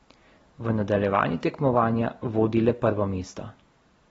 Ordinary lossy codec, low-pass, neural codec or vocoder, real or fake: AAC, 24 kbps; 19.8 kHz; none; real